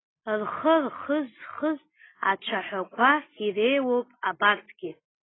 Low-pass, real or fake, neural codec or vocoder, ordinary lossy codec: 7.2 kHz; real; none; AAC, 16 kbps